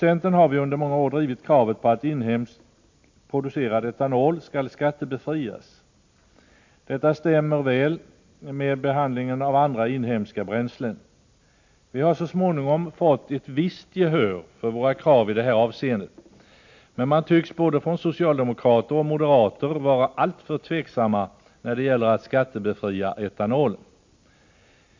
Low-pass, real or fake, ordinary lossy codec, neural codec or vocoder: 7.2 kHz; real; MP3, 48 kbps; none